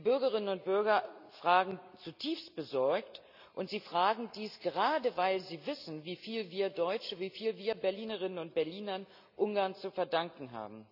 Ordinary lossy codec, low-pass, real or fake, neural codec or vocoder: none; 5.4 kHz; real; none